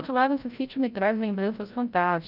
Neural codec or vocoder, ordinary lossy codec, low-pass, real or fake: codec, 16 kHz, 0.5 kbps, FreqCodec, larger model; none; 5.4 kHz; fake